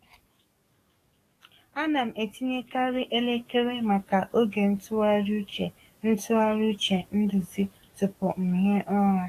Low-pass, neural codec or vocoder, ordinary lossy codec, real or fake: 14.4 kHz; codec, 44.1 kHz, 7.8 kbps, DAC; AAC, 48 kbps; fake